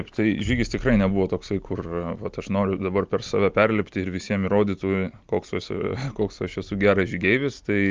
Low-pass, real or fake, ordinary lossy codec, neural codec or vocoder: 7.2 kHz; real; Opus, 24 kbps; none